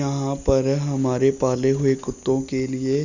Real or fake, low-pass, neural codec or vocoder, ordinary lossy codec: real; 7.2 kHz; none; none